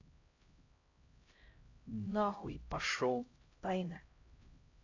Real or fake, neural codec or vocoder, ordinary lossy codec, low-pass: fake; codec, 16 kHz, 0.5 kbps, X-Codec, HuBERT features, trained on LibriSpeech; AAC, 32 kbps; 7.2 kHz